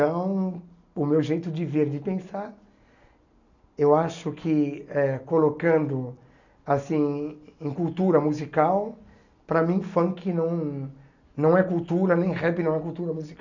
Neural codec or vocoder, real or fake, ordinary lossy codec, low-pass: none; real; none; 7.2 kHz